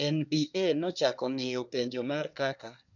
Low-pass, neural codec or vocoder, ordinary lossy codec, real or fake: 7.2 kHz; codec, 24 kHz, 1 kbps, SNAC; none; fake